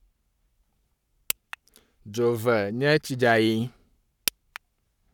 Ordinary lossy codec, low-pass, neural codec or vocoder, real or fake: none; 19.8 kHz; codec, 44.1 kHz, 7.8 kbps, Pupu-Codec; fake